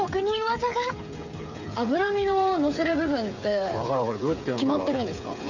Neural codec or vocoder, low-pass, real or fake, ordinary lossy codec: codec, 16 kHz, 8 kbps, FreqCodec, smaller model; 7.2 kHz; fake; none